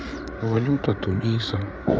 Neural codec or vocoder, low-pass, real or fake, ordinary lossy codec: codec, 16 kHz, 8 kbps, FreqCodec, larger model; none; fake; none